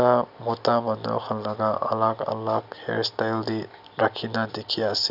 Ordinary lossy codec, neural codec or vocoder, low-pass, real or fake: none; none; 5.4 kHz; real